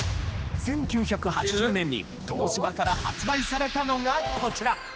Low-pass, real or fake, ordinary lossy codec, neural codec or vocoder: none; fake; none; codec, 16 kHz, 2 kbps, X-Codec, HuBERT features, trained on general audio